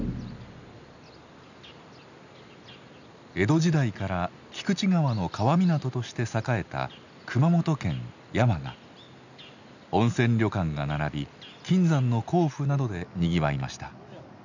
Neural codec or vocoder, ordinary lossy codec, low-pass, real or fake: none; none; 7.2 kHz; real